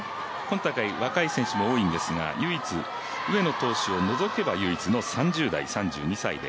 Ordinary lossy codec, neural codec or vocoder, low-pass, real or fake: none; none; none; real